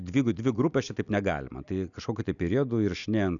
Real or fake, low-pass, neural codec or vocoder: real; 7.2 kHz; none